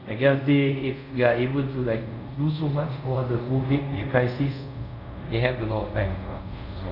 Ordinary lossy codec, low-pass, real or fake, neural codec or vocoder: none; 5.4 kHz; fake; codec, 24 kHz, 0.5 kbps, DualCodec